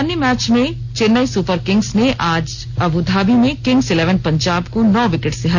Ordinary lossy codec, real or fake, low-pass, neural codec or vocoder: MP3, 64 kbps; real; 7.2 kHz; none